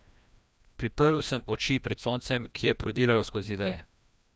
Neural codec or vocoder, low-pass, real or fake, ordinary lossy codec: codec, 16 kHz, 1 kbps, FreqCodec, larger model; none; fake; none